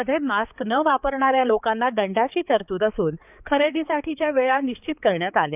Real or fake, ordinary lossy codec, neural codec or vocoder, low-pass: fake; none; codec, 16 kHz, 4 kbps, X-Codec, HuBERT features, trained on balanced general audio; 3.6 kHz